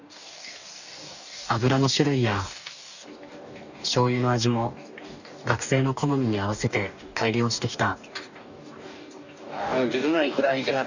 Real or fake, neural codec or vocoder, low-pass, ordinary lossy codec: fake; codec, 44.1 kHz, 2.6 kbps, DAC; 7.2 kHz; none